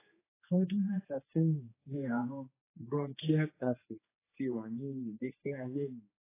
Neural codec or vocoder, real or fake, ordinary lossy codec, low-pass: codec, 16 kHz, 2 kbps, X-Codec, HuBERT features, trained on general audio; fake; AAC, 16 kbps; 3.6 kHz